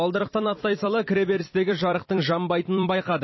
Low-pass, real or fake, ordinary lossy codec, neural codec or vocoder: 7.2 kHz; fake; MP3, 24 kbps; vocoder, 44.1 kHz, 128 mel bands every 256 samples, BigVGAN v2